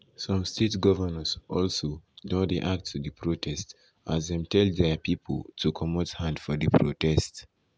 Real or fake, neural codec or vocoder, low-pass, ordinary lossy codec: real; none; none; none